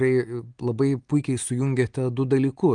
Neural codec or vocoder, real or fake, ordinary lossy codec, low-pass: none; real; Opus, 24 kbps; 9.9 kHz